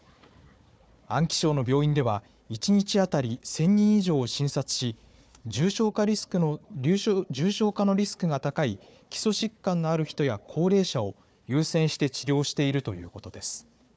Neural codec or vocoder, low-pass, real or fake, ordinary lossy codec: codec, 16 kHz, 4 kbps, FunCodec, trained on Chinese and English, 50 frames a second; none; fake; none